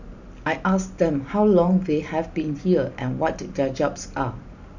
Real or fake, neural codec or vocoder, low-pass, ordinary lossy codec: real; none; 7.2 kHz; none